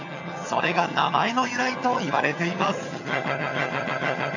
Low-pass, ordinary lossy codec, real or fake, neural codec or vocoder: 7.2 kHz; none; fake; vocoder, 22.05 kHz, 80 mel bands, HiFi-GAN